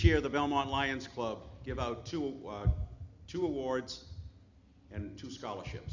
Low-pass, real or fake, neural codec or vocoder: 7.2 kHz; real; none